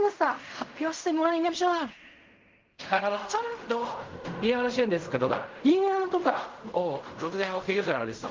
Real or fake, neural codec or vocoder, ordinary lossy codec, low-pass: fake; codec, 16 kHz in and 24 kHz out, 0.4 kbps, LongCat-Audio-Codec, fine tuned four codebook decoder; Opus, 24 kbps; 7.2 kHz